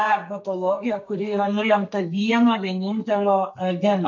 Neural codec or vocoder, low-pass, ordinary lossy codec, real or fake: codec, 32 kHz, 1.9 kbps, SNAC; 7.2 kHz; MP3, 48 kbps; fake